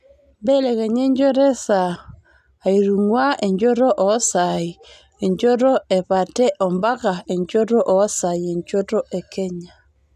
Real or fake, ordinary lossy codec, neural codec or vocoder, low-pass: real; none; none; 14.4 kHz